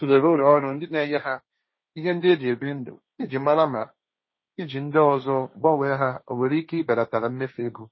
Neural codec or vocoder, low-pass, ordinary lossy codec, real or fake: codec, 16 kHz, 1.1 kbps, Voila-Tokenizer; 7.2 kHz; MP3, 24 kbps; fake